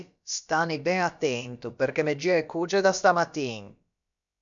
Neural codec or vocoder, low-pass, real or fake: codec, 16 kHz, about 1 kbps, DyCAST, with the encoder's durations; 7.2 kHz; fake